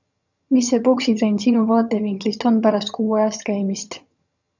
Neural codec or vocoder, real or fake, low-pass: vocoder, 22.05 kHz, 80 mel bands, HiFi-GAN; fake; 7.2 kHz